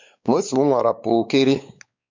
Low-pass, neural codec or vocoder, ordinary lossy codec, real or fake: 7.2 kHz; codec, 16 kHz, 4 kbps, X-Codec, WavLM features, trained on Multilingual LibriSpeech; MP3, 64 kbps; fake